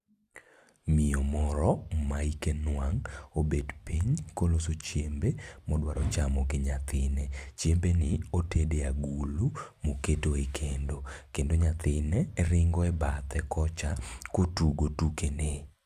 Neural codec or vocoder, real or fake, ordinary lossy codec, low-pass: none; real; AAC, 96 kbps; 14.4 kHz